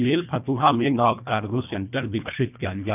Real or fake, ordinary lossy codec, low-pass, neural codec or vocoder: fake; none; 3.6 kHz; codec, 24 kHz, 1.5 kbps, HILCodec